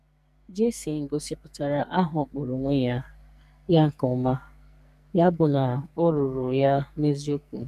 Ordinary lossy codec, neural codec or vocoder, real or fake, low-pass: none; codec, 44.1 kHz, 2.6 kbps, SNAC; fake; 14.4 kHz